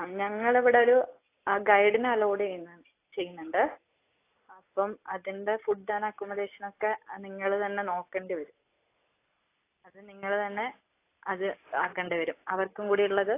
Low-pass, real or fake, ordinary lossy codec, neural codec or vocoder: 3.6 kHz; real; AAC, 24 kbps; none